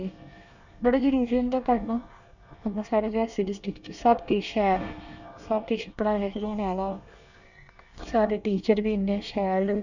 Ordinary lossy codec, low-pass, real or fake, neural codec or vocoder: none; 7.2 kHz; fake; codec, 24 kHz, 1 kbps, SNAC